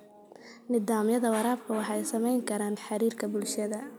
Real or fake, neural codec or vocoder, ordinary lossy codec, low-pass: real; none; none; none